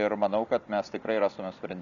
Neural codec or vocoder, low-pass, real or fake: none; 7.2 kHz; real